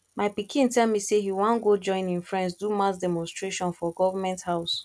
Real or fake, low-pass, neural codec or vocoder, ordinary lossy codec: real; none; none; none